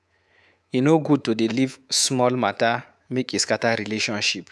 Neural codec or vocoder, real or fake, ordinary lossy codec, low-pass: codec, 24 kHz, 3.1 kbps, DualCodec; fake; none; none